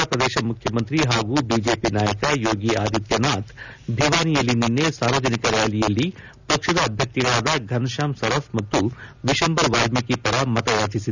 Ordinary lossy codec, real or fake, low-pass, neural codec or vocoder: none; real; 7.2 kHz; none